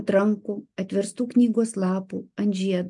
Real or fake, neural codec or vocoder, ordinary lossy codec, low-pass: real; none; MP3, 64 kbps; 10.8 kHz